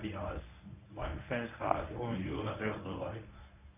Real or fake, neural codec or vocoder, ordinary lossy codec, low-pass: fake; codec, 24 kHz, 0.9 kbps, WavTokenizer, medium speech release version 1; none; 3.6 kHz